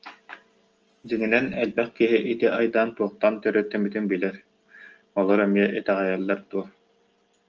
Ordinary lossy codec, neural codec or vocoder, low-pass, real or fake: Opus, 24 kbps; none; 7.2 kHz; real